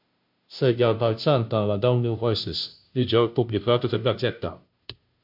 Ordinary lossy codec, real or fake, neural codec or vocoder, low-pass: MP3, 48 kbps; fake; codec, 16 kHz, 0.5 kbps, FunCodec, trained on Chinese and English, 25 frames a second; 5.4 kHz